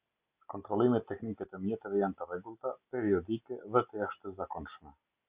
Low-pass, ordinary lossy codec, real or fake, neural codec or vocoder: 3.6 kHz; Opus, 64 kbps; real; none